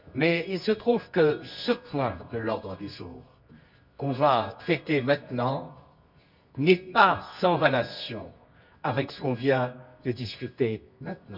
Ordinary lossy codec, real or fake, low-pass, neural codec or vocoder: none; fake; 5.4 kHz; codec, 24 kHz, 0.9 kbps, WavTokenizer, medium music audio release